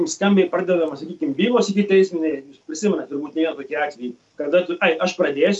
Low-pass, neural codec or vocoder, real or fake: 10.8 kHz; none; real